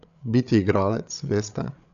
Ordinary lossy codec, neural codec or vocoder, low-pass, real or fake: none; codec, 16 kHz, 8 kbps, FreqCodec, larger model; 7.2 kHz; fake